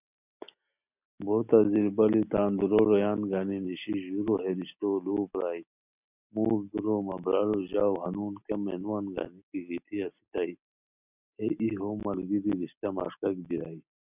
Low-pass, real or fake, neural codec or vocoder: 3.6 kHz; real; none